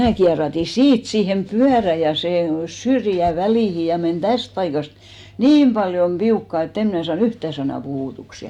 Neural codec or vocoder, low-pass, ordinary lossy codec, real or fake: none; 19.8 kHz; none; real